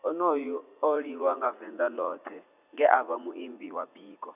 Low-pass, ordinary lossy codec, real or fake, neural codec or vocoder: 3.6 kHz; none; fake; vocoder, 44.1 kHz, 80 mel bands, Vocos